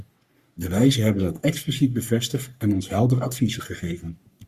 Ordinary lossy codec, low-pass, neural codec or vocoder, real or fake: Opus, 64 kbps; 14.4 kHz; codec, 44.1 kHz, 3.4 kbps, Pupu-Codec; fake